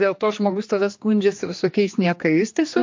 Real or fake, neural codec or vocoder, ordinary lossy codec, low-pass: fake; codec, 16 kHz, 2 kbps, X-Codec, HuBERT features, trained on general audio; MP3, 48 kbps; 7.2 kHz